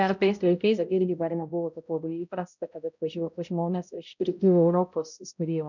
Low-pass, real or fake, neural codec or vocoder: 7.2 kHz; fake; codec, 16 kHz, 0.5 kbps, X-Codec, HuBERT features, trained on balanced general audio